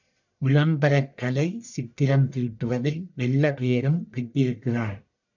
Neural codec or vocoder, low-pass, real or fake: codec, 44.1 kHz, 1.7 kbps, Pupu-Codec; 7.2 kHz; fake